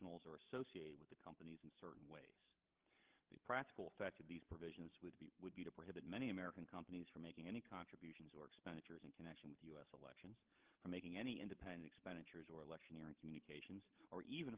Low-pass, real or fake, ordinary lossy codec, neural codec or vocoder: 3.6 kHz; fake; Opus, 16 kbps; codec, 16 kHz, 16 kbps, FreqCodec, larger model